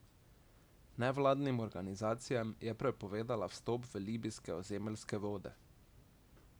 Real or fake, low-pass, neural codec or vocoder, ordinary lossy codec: real; none; none; none